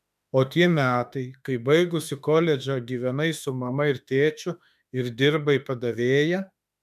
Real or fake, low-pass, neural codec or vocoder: fake; 14.4 kHz; autoencoder, 48 kHz, 32 numbers a frame, DAC-VAE, trained on Japanese speech